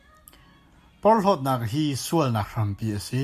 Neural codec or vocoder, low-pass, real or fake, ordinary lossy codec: none; 14.4 kHz; real; AAC, 96 kbps